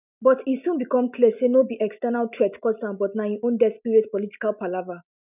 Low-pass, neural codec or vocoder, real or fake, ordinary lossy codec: 3.6 kHz; none; real; none